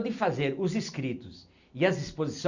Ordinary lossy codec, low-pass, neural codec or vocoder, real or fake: none; 7.2 kHz; none; real